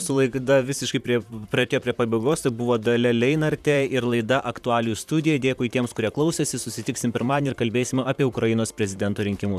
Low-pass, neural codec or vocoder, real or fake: 14.4 kHz; vocoder, 44.1 kHz, 128 mel bands, Pupu-Vocoder; fake